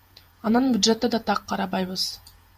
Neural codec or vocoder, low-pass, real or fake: vocoder, 48 kHz, 128 mel bands, Vocos; 14.4 kHz; fake